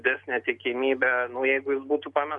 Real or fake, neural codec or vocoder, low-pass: real; none; 10.8 kHz